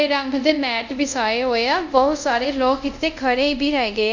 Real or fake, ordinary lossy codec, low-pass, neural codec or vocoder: fake; none; 7.2 kHz; codec, 24 kHz, 0.5 kbps, DualCodec